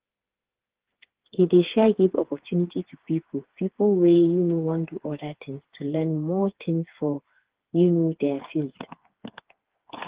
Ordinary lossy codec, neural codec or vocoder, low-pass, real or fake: Opus, 16 kbps; codec, 16 kHz, 4 kbps, FreqCodec, smaller model; 3.6 kHz; fake